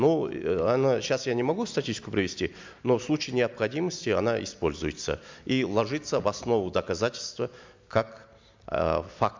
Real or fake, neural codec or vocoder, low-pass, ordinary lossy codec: real; none; 7.2 kHz; MP3, 64 kbps